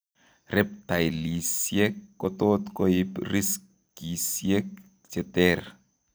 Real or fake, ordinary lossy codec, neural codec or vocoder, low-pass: real; none; none; none